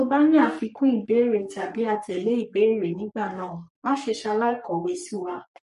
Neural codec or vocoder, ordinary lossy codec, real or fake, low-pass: codec, 44.1 kHz, 3.4 kbps, Pupu-Codec; MP3, 48 kbps; fake; 14.4 kHz